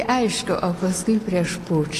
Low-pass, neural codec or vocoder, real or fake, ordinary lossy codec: 14.4 kHz; none; real; AAC, 48 kbps